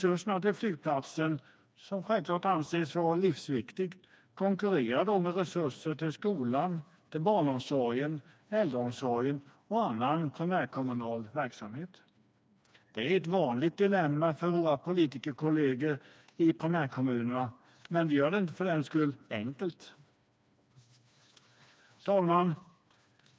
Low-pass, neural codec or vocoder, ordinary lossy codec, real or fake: none; codec, 16 kHz, 2 kbps, FreqCodec, smaller model; none; fake